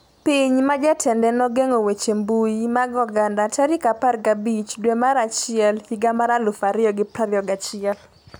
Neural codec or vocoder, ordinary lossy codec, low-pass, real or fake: none; none; none; real